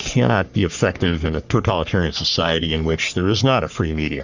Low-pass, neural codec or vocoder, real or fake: 7.2 kHz; codec, 44.1 kHz, 3.4 kbps, Pupu-Codec; fake